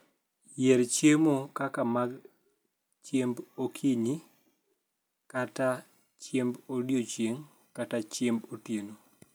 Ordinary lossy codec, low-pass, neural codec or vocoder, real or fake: none; none; none; real